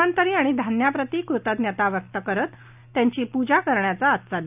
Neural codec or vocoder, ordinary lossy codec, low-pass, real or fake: none; none; 3.6 kHz; real